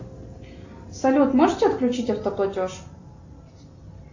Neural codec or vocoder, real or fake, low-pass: none; real; 7.2 kHz